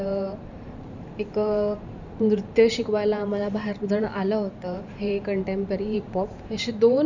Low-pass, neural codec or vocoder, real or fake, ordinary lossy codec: 7.2 kHz; vocoder, 44.1 kHz, 128 mel bands every 512 samples, BigVGAN v2; fake; none